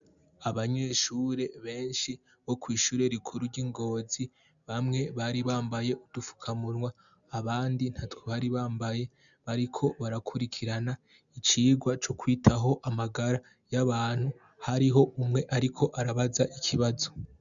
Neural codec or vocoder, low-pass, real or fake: none; 7.2 kHz; real